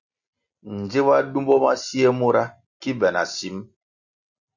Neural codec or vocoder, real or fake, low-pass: none; real; 7.2 kHz